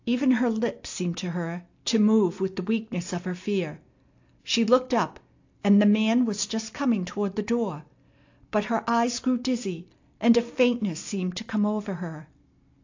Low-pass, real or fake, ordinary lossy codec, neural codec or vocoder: 7.2 kHz; real; AAC, 48 kbps; none